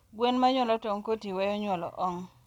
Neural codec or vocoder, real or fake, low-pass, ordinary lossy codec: none; real; 19.8 kHz; none